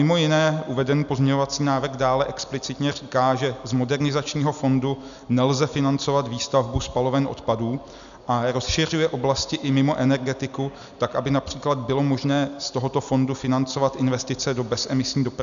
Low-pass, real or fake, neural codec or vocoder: 7.2 kHz; real; none